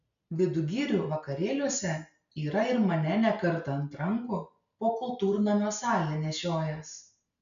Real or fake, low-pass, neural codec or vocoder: real; 7.2 kHz; none